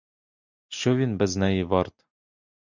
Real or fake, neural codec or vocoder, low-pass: real; none; 7.2 kHz